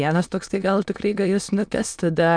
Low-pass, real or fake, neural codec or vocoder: 9.9 kHz; fake; autoencoder, 22.05 kHz, a latent of 192 numbers a frame, VITS, trained on many speakers